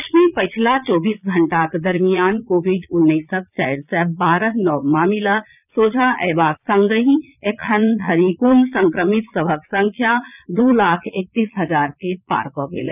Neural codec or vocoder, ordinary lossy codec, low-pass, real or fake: vocoder, 22.05 kHz, 80 mel bands, Vocos; none; 3.6 kHz; fake